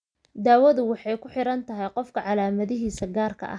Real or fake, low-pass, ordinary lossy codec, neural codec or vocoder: real; 9.9 kHz; none; none